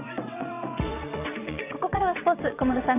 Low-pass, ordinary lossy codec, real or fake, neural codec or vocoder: 3.6 kHz; none; fake; vocoder, 22.05 kHz, 80 mel bands, WaveNeXt